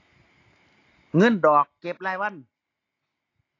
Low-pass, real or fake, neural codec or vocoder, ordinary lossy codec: 7.2 kHz; real; none; AAC, 32 kbps